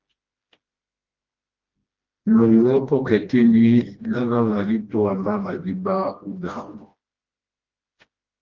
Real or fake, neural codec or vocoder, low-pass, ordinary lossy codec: fake; codec, 16 kHz, 1 kbps, FreqCodec, smaller model; 7.2 kHz; Opus, 32 kbps